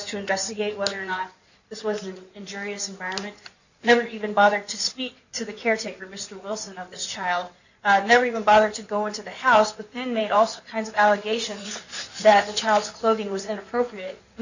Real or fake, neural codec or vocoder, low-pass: fake; codec, 16 kHz in and 24 kHz out, 2.2 kbps, FireRedTTS-2 codec; 7.2 kHz